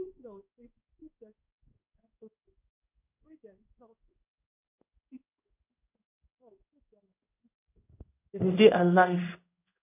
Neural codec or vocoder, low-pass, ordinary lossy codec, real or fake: codec, 16 kHz in and 24 kHz out, 1 kbps, XY-Tokenizer; 3.6 kHz; none; fake